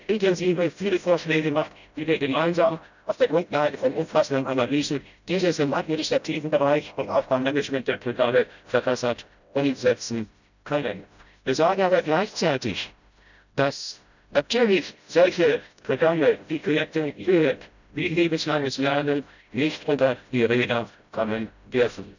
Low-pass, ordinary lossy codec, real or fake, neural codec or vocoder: 7.2 kHz; none; fake; codec, 16 kHz, 0.5 kbps, FreqCodec, smaller model